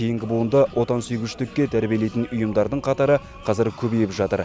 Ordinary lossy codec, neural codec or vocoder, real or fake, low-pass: none; none; real; none